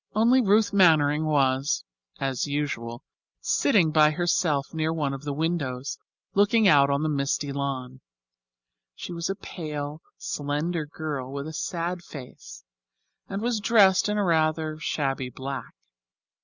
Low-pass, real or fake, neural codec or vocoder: 7.2 kHz; real; none